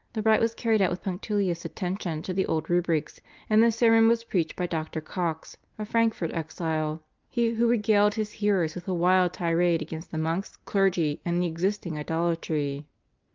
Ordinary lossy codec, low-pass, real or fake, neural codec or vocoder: Opus, 24 kbps; 7.2 kHz; real; none